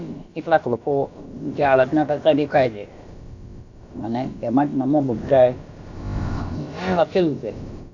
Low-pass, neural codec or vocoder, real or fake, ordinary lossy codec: 7.2 kHz; codec, 16 kHz, about 1 kbps, DyCAST, with the encoder's durations; fake; none